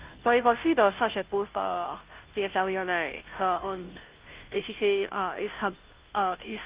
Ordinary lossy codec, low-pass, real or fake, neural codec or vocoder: Opus, 32 kbps; 3.6 kHz; fake; codec, 16 kHz, 0.5 kbps, FunCodec, trained on Chinese and English, 25 frames a second